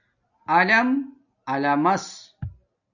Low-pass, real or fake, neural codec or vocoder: 7.2 kHz; real; none